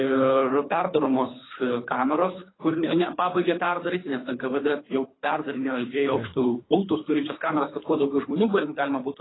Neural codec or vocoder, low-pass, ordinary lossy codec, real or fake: codec, 24 kHz, 3 kbps, HILCodec; 7.2 kHz; AAC, 16 kbps; fake